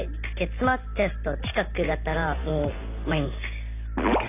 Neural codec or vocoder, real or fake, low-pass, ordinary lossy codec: none; real; 3.6 kHz; AAC, 24 kbps